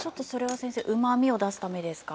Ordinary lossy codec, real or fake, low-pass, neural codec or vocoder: none; real; none; none